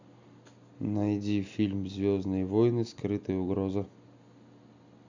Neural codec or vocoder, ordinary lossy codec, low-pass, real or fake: none; Opus, 64 kbps; 7.2 kHz; real